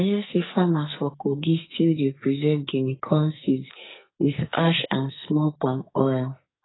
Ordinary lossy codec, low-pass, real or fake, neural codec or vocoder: AAC, 16 kbps; 7.2 kHz; fake; codec, 44.1 kHz, 2.6 kbps, SNAC